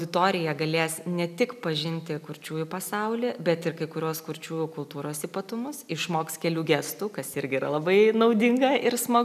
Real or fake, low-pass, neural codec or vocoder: real; 14.4 kHz; none